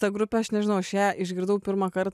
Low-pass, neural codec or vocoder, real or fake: 14.4 kHz; none; real